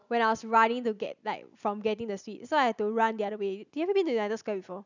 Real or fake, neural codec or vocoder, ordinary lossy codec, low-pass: real; none; none; 7.2 kHz